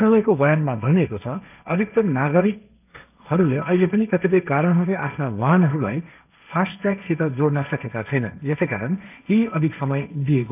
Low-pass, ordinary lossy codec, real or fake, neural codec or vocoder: 3.6 kHz; none; fake; codec, 16 kHz, 1.1 kbps, Voila-Tokenizer